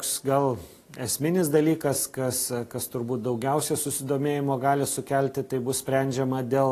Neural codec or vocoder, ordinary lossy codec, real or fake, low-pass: none; AAC, 48 kbps; real; 14.4 kHz